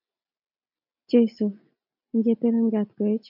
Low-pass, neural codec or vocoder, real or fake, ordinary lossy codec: 5.4 kHz; none; real; MP3, 48 kbps